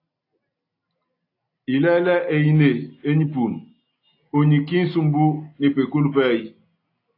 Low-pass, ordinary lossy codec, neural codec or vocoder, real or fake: 5.4 kHz; AAC, 32 kbps; none; real